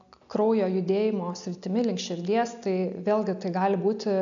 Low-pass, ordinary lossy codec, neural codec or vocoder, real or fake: 7.2 kHz; MP3, 96 kbps; none; real